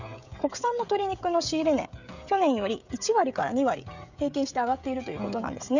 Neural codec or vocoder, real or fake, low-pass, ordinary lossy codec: codec, 16 kHz, 16 kbps, FreqCodec, smaller model; fake; 7.2 kHz; none